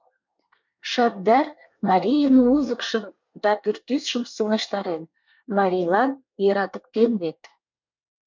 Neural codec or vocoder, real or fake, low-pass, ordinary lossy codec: codec, 24 kHz, 1 kbps, SNAC; fake; 7.2 kHz; MP3, 64 kbps